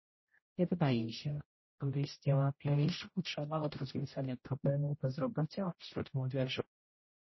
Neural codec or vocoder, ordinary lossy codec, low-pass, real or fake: codec, 16 kHz, 0.5 kbps, X-Codec, HuBERT features, trained on general audio; MP3, 24 kbps; 7.2 kHz; fake